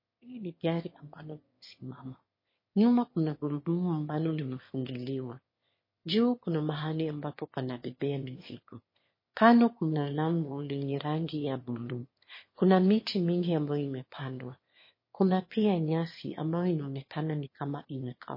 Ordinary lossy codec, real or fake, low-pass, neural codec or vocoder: MP3, 24 kbps; fake; 5.4 kHz; autoencoder, 22.05 kHz, a latent of 192 numbers a frame, VITS, trained on one speaker